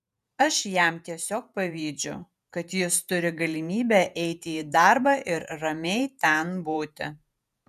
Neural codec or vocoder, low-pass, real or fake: vocoder, 48 kHz, 128 mel bands, Vocos; 14.4 kHz; fake